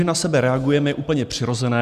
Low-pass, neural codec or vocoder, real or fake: 14.4 kHz; none; real